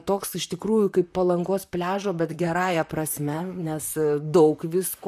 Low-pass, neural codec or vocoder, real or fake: 14.4 kHz; vocoder, 44.1 kHz, 128 mel bands, Pupu-Vocoder; fake